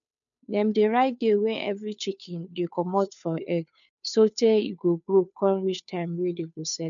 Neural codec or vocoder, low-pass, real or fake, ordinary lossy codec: codec, 16 kHz, 2 kbps, FunCodec, trained on Chinese and English, 25 frames a second; 7.2 kHz; fake; none